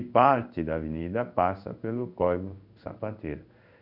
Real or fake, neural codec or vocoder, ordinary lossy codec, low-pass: fake; codec, 16 kHz in and 24 kHz out, 1 kbps, XY-Tokenizer; none; 5.4 kHz